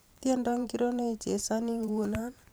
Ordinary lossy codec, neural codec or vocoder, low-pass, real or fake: none; vocoder, 44.1 kHz, 128 mel bands every 512 samples, BigVGAN v2; none; fake